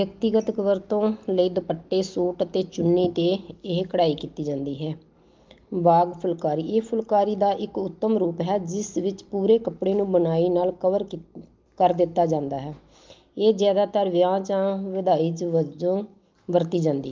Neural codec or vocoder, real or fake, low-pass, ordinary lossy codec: none; real; 7.2 kHz; Opus, 24 kbps